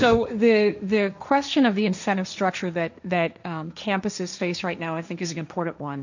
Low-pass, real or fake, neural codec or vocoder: 7.2 kHz; fake; codec, 16 kHz, 1.1 kbps, Voila-Tokenizer